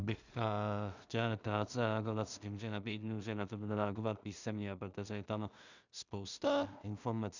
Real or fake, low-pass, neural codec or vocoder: fake; 7.2 kHz; codec, 16 kHz in and 24 kHz out, 0.4 kbps, LongCat-Audio-Codec, two codebook decoder